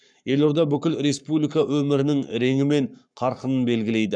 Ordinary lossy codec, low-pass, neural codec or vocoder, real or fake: none; 9.9 kHz; codec, 44.1 kHz, 7.8 kbps, Pupu-Codec; fake